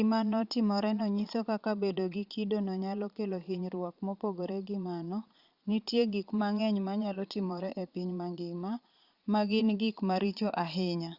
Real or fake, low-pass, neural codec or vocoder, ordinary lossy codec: fake; 5.4 kHz; vocoder, 22.05 kHz, 80 mel bands, Vocos; Opus, 64 kbps